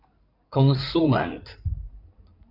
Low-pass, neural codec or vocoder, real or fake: 5.4 kHz; codec, 16 kHz in and 24 kHz out, 2.2 kbps, FireRedTTS-2 codec; fake